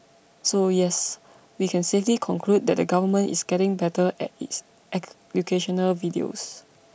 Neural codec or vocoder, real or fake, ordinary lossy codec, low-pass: none; real; none; none